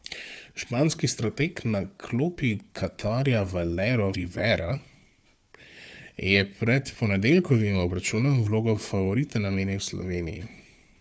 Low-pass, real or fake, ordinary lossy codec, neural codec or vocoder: none; fake; none; codec, 16 kHz, 4 kbps, FunCodec, trained on Chinese and English, 50 frames a second